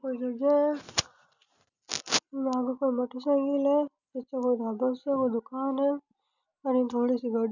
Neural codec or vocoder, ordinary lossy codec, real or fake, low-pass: none; none; real; 7.2 kHz